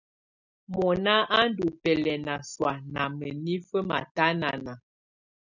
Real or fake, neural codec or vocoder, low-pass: real; none; 7.2 kHz